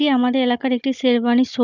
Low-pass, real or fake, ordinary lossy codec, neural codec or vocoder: 7.2 kHz; fake; none; codec, 16 kHz, 16 kbps, FunCodec, trained on Chinese and English, 50 frames a second